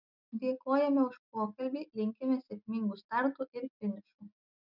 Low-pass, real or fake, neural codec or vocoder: 5.4 kHz; real; none